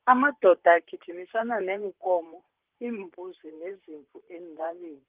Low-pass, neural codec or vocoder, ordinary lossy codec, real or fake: 3.6 kHz; vocoder, 44.1 kHz, 128 mel bands, Pupu-Vocoder; Opus, 32 kbps; fake